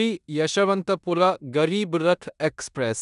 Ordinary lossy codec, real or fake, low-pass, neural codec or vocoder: none; fake; 10.8 kHz; codec, 16 kHz in and 24 kHz out, 0.9 kbps, LongCat-Audio-Codec, four codebook decoder